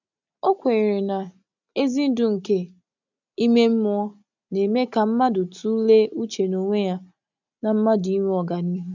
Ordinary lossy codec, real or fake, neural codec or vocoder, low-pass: none; real; none; 7.2 kHz